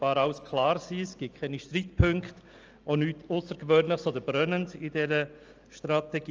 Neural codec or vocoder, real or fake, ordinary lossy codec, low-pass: none; real; Opus, 24 kbps; 7.2 kHz